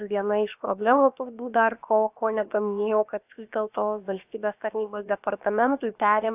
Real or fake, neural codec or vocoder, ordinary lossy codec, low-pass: fake; codec, 16 kHz, about 1 kbps, DyCAST, with the encoder's durations; AAC, 32 kbps; 3.6 kHz